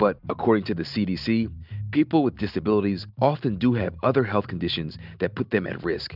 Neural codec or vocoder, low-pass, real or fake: none; 5.4 kHz; real